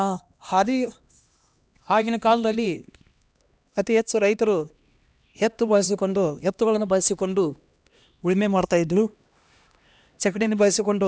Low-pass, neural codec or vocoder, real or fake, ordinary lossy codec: none; codec, 16 kHz, 1 kbps, X-Codec, HuBERT features, trained on LibriSpeech; fake; none